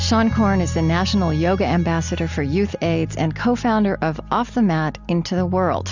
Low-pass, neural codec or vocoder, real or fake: 7.2 kHz; none; real